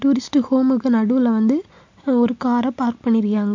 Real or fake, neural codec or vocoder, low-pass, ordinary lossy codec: real; none; 7.2 kHz; MP3, 48 kbps